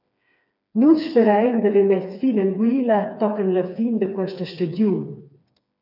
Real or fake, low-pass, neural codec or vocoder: fake; 5.4 kHz; codec, 16 kHz, 4 kbps, FreqCodec, smaller model